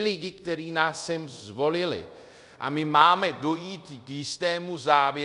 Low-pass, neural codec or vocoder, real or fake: 10.8 kHz; codec, 24 kHz, 0.5 kbps, DualCodec; fake